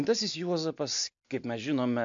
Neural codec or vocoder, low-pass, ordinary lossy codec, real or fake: none; 7.2 kHz; AAC, 64 kbps; real